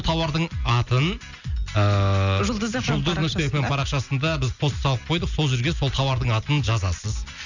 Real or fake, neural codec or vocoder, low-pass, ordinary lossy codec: real; none; 7.2 kHz; none